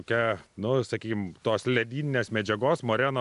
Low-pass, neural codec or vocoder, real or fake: 10.8 kHz; none; real